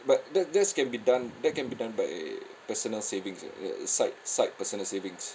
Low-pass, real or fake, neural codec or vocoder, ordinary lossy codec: none; real; none; none